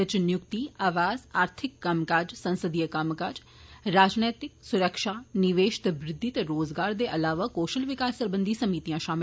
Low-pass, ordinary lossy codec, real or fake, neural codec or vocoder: none; none; real; none